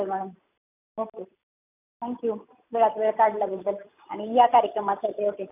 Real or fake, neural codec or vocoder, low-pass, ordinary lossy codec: real; none; 3.6 kHz; none